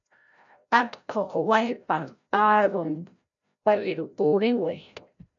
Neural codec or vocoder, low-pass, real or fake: codec, 16 kHz, 0.5 kbps, FreqCodec, larger model; 7.2 kHz; fake